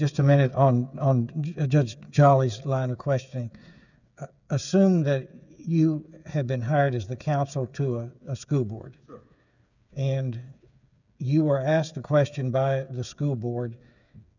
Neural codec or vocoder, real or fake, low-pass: codec, 16 kHz, 8 kbps, FreqCodec, smaller model; fake; 7.2 kHz